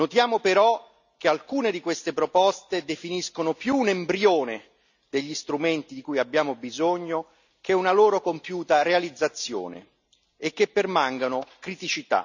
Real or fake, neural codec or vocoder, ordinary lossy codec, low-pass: real; none; none; 7.2 kHz